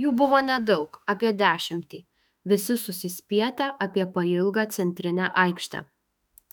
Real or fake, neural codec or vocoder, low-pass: fake; autoencoder, 48 kHz, 32 numbers a frame, DAC-VAE, trained on Japanese speech; 19.8 kHz